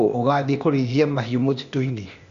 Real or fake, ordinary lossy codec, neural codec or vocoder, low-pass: fake; Opus, 64 kbps; codec, 16 kHz, 0.8 kbps, ZipCodec; 7.2 kHz